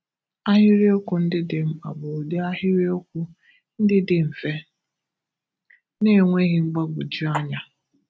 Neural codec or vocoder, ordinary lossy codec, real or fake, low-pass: none; none; real; none